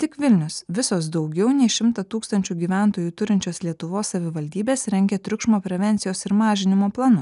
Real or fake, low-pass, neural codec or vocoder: real; 10.8 kHz; none